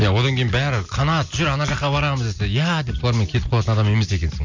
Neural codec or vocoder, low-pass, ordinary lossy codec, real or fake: none; 7.2 kHz; MP3, 64 kbps; real